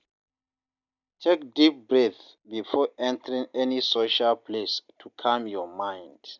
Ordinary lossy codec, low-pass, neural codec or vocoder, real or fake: none; 7.2 kHz; none; real